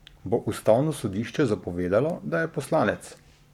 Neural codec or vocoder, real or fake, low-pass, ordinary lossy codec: codec, 44.1 kHz, 7.8 kbps, Pupu-Codec; fake; 19.8 kHz; none